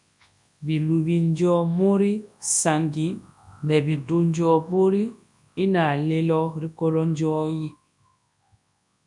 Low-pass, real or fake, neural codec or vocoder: 10.8 kHz; fake; codec, 24 kHz, 0.9 kbps, WavTokenizer, large speech release